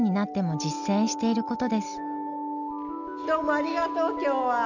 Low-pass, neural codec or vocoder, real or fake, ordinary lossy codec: 7.2 kHz; none; real; none